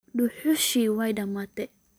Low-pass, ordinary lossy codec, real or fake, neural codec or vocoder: none; none; real; none